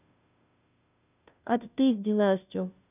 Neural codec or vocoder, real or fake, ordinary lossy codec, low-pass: codec, 16 kHz, 0.5 kbps, FunCodec, trained on Chinese and English, 25 frames a second; fake; none; 3.6 kHz